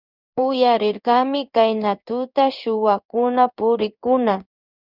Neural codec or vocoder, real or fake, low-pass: codec, 16 kHz in and 24 kHz out, 1 kbps, XY-Tokenizer; fake; 5.4 kHz